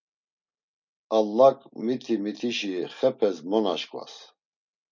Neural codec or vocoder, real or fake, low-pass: none; real; 7.2 kHz